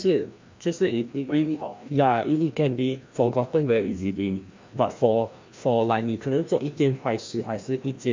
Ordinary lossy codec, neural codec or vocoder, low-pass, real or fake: MP3, 48 kbps; codec, 16 kHz, 1 kbps, FreqCodec, larger model; 7.2 kHz; fake